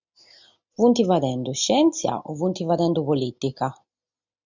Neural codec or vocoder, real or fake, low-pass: none; real; 7.2 kHz